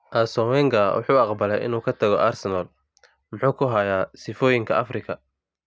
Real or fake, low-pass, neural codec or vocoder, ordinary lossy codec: real; none; none; none